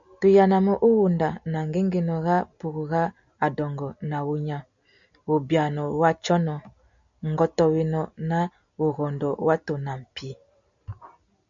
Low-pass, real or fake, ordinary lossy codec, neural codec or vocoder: 7.2 kHz; real; MP3, 96 kbps; none